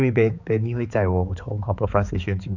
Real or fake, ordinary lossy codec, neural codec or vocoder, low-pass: fake; none; codec, 16 kHz, 4 kbps, X-Codec, HuBERT features, trained on balanced general audio; 7.2 kHz